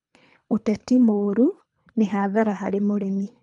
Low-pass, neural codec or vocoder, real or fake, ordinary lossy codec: 10.8 kHz; codec, 24 kHz, 3 kbps, HILCodec; fake; none